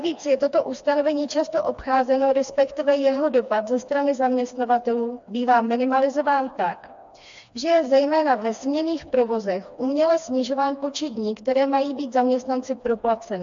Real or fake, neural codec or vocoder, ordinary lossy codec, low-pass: fake; codec, 16 kHz, 2 kbps, FreqCodec, smaller model; AAC, 64 kbps; 7.2 kHz